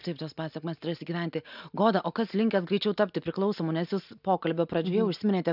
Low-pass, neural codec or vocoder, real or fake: 5.4 kHz; none; real